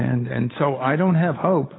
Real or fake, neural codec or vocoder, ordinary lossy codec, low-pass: fake; codec, 16 kHz, 8 kbps, FunCodec, trained on Chinese and English, 25 frames a second; AAC, 16 kbps; 7.2 kHz